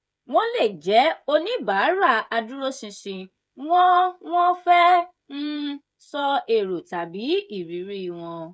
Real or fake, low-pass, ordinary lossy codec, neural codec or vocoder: fake; none; none; codec, 16 kHz, 16 kbps, FreqCodec, smaller model